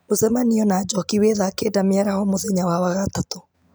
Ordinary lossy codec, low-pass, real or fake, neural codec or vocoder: none; none; real; none